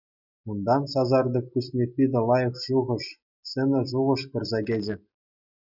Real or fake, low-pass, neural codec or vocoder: real; 5.4 kHz; none